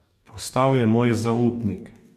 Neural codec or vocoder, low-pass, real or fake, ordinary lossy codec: codec, 44.1 kHz, 2.6 kbps, DAC; 14.4 kHz; fake; MP3, 96 kbps